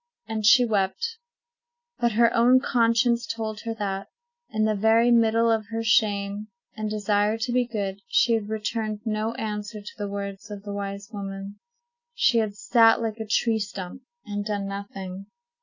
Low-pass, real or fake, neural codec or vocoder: 7.2 kHz; real; none